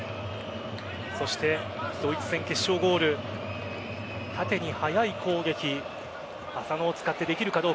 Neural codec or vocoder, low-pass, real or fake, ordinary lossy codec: none; none; real; none